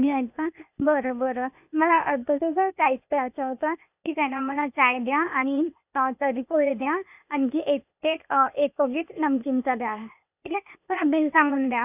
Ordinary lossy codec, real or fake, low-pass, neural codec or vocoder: none; fake; 3.6 kHz; codec, 16 kHz, 0.8 kbps, ZipCodec